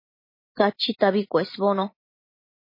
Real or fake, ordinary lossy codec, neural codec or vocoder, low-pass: real; MP3, 24 kbps; none; 5.4 kHz